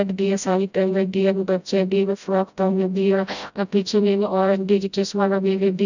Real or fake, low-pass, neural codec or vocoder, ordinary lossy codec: fake; 7.2 kHz; codec, 16 kHz, 0.5 kbps, FreqCodec, smaller model; none